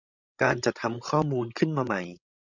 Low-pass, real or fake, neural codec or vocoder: 7.2 kHz; fake; codec, 16 kHz, 16 kbps, FreqCodec, larger model